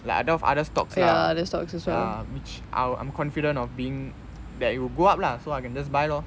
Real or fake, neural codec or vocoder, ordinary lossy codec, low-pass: real; none; none; none